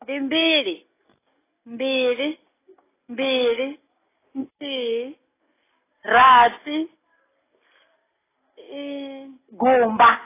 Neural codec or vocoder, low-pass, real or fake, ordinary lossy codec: none; 3.6 kHz; real; AAC, 16 kbps